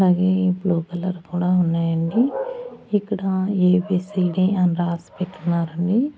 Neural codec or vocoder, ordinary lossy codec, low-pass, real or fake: none; none; none; real